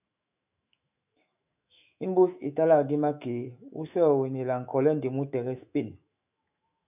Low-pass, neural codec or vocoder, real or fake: 3.6 kHz; autoencoder, 48 kHz, 128 numbers a frame, DAC-VAE, trained on Japanese speech; fake